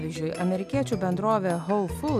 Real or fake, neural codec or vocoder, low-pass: real; none; 14.4 kHz